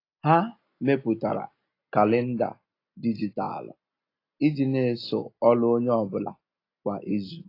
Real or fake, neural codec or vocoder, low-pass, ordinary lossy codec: real; none; 5.4 kHz; AAC, 32 kbps